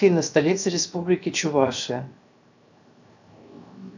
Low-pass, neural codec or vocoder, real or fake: 7.2 kHz; codec, 16 kHz, 0.7 kbps, FocalCodec; fake